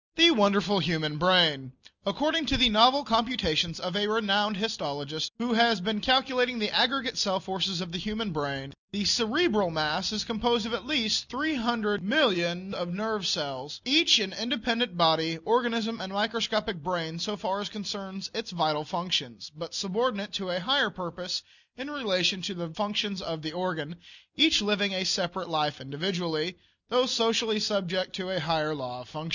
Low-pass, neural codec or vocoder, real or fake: 7.2 kHz; none; real